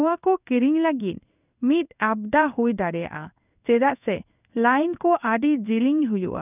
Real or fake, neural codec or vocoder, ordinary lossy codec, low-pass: fake; codec, 16 kHz in and 24 kHz out, 1 kbps, XY-Tokenizer; none; 3.6 kHz